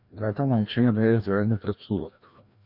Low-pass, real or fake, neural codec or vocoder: 5.4 kHz; fake; codec, 16 kHz, 1 kbps, FreqCodec, larger model